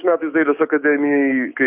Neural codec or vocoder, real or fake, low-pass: codec, 16 kHz, 6 kbps, DAC; fake; 3.6 kHz